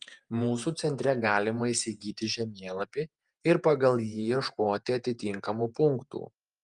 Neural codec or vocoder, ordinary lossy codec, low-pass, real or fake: vocoder, 48 kHz, 128 mel bands, Vocos; Opus, 32 kbps; 10.8 kHz; fake